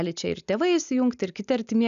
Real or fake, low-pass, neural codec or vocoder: real; 7.2 kHz; none